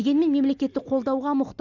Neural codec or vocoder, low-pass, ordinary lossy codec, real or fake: none; 7.2 kHz; none; real